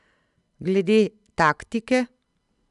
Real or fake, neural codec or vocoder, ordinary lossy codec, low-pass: real; none; none; 10.8 kHz